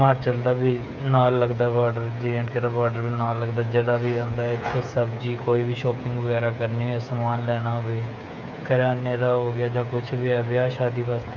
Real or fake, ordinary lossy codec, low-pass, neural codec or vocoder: fake; none; 7.2 kHz; codec, 16 kHz, 8 kbps, FreqCodec, smaller model